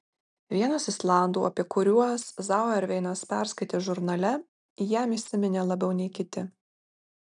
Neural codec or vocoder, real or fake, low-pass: none; real; 9.9 kHz